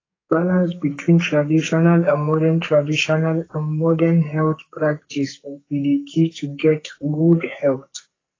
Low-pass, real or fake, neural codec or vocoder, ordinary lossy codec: 7.2 kHz; fake; codec, 44.1 kHz, 2.6 kbps, SNAC; AAC, 32 kbps